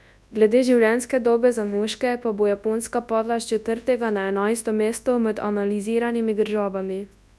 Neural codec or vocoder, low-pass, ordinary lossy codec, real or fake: codec, 24 kHz, 0.9 kbps, WavTokenizer, large speech release; none; none; fake